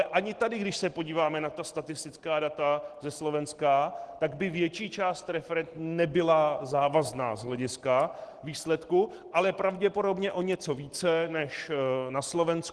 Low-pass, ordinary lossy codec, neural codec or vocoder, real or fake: 10.8 kHz; Opus, 16 kbps; none; real